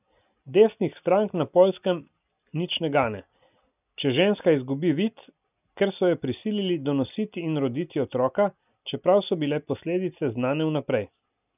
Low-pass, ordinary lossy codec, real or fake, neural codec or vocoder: 3.6 kHz; none; real; none